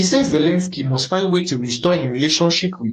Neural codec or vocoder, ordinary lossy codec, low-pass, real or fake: codec, 44.1 kHz, 2.6 kbps, DAC; AAC, 64 kbps; 14.4 kHz; fake